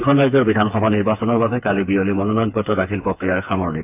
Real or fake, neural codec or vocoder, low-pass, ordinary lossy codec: fake; codec, 16 kHz, 4 kbps, FreqCodec, smaller model; 3.6 kHz; AAC, 24 kbps